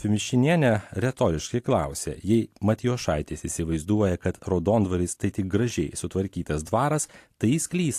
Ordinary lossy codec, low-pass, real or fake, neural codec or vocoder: AAC, 64 kbps; 14.4 kHz; real; none